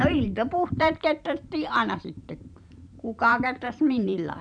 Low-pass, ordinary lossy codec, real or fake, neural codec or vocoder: 9.9 kHz; none; fake; vocoder, 44.1 kHz, 128 mel bands every 512 samples, BigVGAN v2